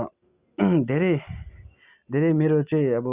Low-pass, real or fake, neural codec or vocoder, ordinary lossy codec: 3.6 kHz; real; none; Opus, 64 kbps